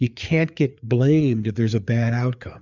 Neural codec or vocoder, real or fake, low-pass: codec, 16 kHz, 4 kbps, FreqCodec, larger model; fake; 7.2 kHz